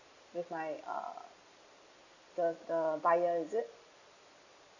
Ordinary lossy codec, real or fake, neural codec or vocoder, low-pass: none; real; none; 7.2 kHz